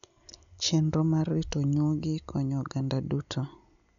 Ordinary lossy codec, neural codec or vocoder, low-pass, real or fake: none; none; 7.2 kHz; real